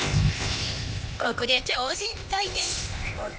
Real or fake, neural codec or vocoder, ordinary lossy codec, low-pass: fake; codec, 16 kHz, 0.8 kbps, ZipCodec; none; none